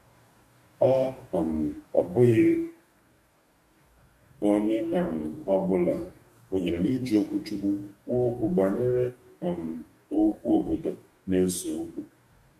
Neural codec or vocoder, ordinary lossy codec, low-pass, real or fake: codec, 44.1 kHz, 2.6 kbps, DAC; MP3, 64 kbps; 14.4 kHz; fake